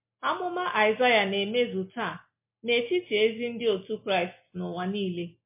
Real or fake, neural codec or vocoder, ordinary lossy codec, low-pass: real; none; MP3, 24 kbps; 3.6 kHz